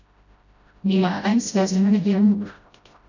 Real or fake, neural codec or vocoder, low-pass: fake; codec, 16 kHz, 0.5 kbps, FreqCodec, smaller model; 7.2 kHz